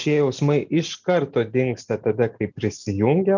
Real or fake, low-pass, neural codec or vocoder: real; 7.2 kHz; none